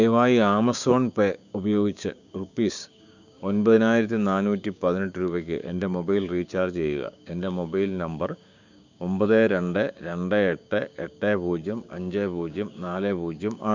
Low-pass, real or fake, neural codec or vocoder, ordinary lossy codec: 7.2 kHz; fake; codec, 16 kHz, 6 kbps, DAC; none